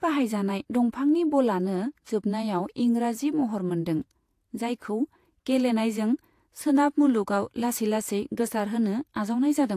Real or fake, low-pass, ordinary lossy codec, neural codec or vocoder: fake; 14.4 kHz; AAC, 64 kbps; vocoder, 48 kHz, 128 mel bands, Vocos